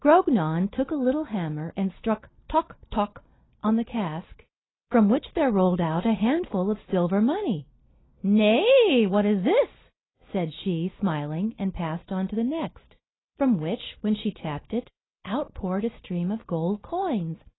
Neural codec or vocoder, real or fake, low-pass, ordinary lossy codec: none; real; 7.2 kHz; AAC, 16 kbps